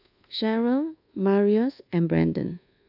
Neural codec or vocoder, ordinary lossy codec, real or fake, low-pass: codec, 24 kHz, 1.2 kbps, DualCodec; AAC, 48 kbps; fake; 5.4 kHz